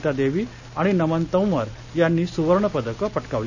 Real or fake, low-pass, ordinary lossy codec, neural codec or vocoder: real; 7.2 kHz; none; none